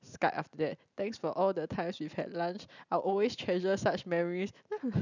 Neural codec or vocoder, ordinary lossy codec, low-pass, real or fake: none; none; 7.2 kHz; real